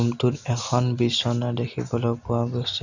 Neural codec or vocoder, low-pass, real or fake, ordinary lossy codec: none; 7.2 kHz; real; none